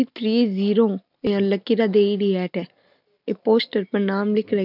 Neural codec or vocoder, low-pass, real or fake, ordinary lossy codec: none; 5.4 kHz; real; none